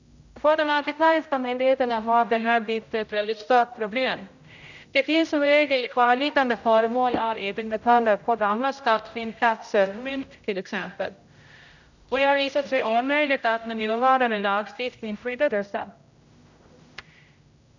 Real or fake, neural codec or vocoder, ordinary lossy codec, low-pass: fake; codec, 16 kHz, 0.5 kbps, X-Codec, HuBERT features, trained on general audio; none; 7.2 kHz